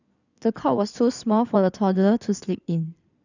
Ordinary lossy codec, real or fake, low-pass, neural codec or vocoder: none; fake; 7.2 kHz; codec, 16 kHz in and 24 kHz out, 2.2 kbps, FireRedTTS-2 codec